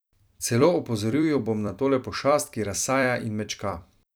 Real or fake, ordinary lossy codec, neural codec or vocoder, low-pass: fake; none; vocoder, 44.1 kHz, 128 mel bands every 256 samples, BigVGAN v2; none